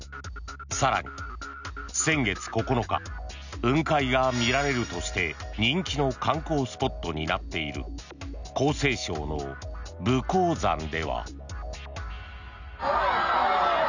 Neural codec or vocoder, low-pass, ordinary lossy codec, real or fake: none; 7.2 kHz; none; real